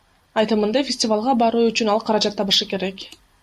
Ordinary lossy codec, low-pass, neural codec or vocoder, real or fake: AAC, 96 kbps; 14.4 kHz; none; real